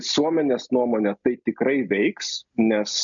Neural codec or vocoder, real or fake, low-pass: none; real; 7.2 kHz